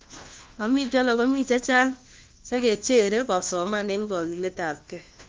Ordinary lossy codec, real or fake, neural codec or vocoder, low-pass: Opus, 24 kbps; fake; codec, 16 kHz, 1 kbps, FunCodec, trained on LibriTTS, 50 frames a second; 7.2 kHz